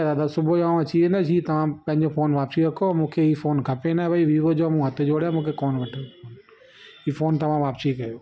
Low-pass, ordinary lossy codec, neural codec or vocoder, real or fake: none; none; none; real